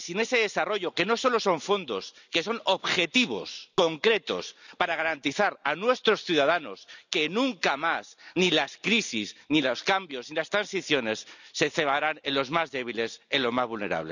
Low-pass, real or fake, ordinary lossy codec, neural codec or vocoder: 7.2 kHz; real; none; none